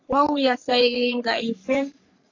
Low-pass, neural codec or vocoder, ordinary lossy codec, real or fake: 7.2 kHz; codec, 44.1 kHz, 3.4 kbps, Pupu-Codec; AAC, 48 kbps; fake